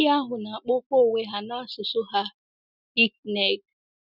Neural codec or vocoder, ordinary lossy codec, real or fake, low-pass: none; none; real; 5.4 kHz